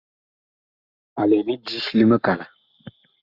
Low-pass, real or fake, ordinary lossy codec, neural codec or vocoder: 5.4 kHz; fake; Opus, 64 kbps; codec, 44.1 kHz, 7.8 kbps, Pupu-Codec